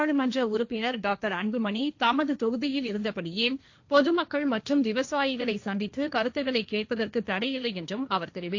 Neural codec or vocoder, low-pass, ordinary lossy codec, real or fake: codec, 16 kHz, 1.1 kbps, Voila-Tokenizer; 7.2 kHz; AAC, 48 kbps; fake